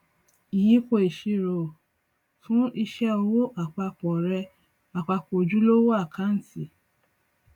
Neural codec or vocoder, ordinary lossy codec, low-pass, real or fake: none; none; 19.8 kHz; real